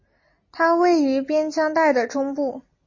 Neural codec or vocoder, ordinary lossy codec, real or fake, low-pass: none; MP3, 32 kbps; real; 7.2 kHz